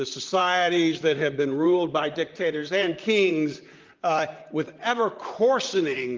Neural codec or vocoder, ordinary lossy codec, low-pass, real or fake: vocoder, 44.1 kHz, 128 mel bands, Pupu-Vocoder; Opus, 24 kbps; 7.2 kHz; fake